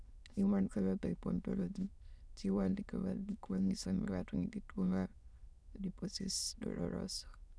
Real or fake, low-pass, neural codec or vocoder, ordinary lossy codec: fake; none; autoencoder, 22.05 kHz, a latent of 192 numbers a frame, VITS, trained on many speakers; none